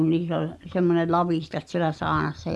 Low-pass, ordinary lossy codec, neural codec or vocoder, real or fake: none; none; none; real